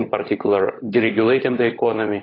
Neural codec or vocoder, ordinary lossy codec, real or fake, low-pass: codec, 16 kHz, 16 kbps, FunCodec, trained on LibriTTS, 50 frames a second; AAC, 24 kbps; fake; 5.4 kHz